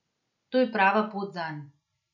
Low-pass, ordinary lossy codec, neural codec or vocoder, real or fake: 7.2 kHz; none; none; real